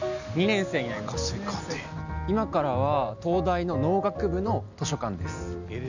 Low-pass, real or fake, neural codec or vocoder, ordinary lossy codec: 7.2 kHz; real; none; none